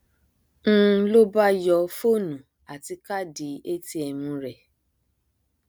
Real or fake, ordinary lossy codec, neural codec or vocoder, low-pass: real; none; none; none